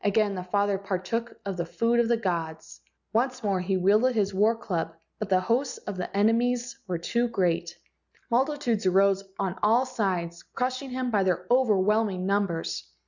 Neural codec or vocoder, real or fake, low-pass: none; real; 7.2 kHz